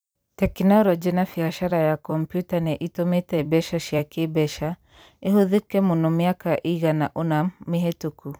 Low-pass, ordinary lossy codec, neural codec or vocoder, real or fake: none; none; vocoder, 44.1 kHz, 128 mel bands every 256 samples, BigVGAN v2; fake